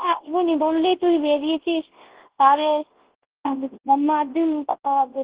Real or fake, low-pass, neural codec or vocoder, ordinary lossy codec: fake; 3.6 kHz; codec, 24 kHz, 0.9 kbps, WavTokenizer, large speech release; Opus, 16 kbps